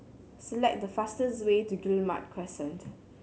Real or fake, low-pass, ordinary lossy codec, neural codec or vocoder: real; none; none; none